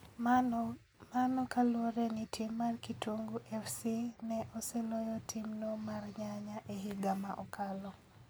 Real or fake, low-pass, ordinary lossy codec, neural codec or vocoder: real; none; none; none